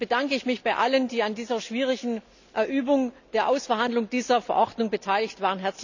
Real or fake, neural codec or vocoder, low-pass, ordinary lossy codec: real; none; 7.2 kHz; none